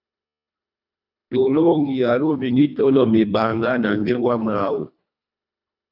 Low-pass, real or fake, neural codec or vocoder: 5.4 kHz; fake; codec, 24 kHz, 1.5 kbps, HILCodec